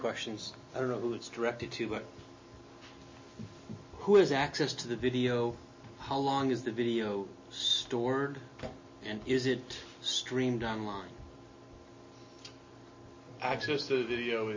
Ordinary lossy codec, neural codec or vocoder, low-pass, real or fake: MP3, 32 kbps; none; 7.2 kHz; real